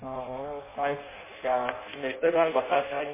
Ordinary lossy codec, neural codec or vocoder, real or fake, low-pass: MP3, 16 kbps; codec, 16 kHz in and 24 kHz out, 0.6 kbps, FireRedTTS-2 codec; fake; 3.6 kHz